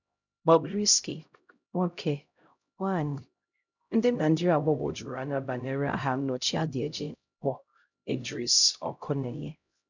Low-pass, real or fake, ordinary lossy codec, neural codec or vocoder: 7.2 kHz; fake; none; codec, 16 kHz, 0.5 kbps, X-Codec, HuBERT features, trained on LibriSpeech